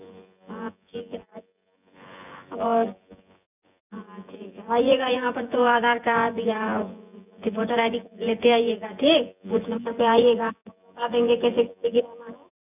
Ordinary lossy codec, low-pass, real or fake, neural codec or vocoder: none; 3.6 kHz; fake; vocoder, 24 kHz, 100 mel bands, Vocos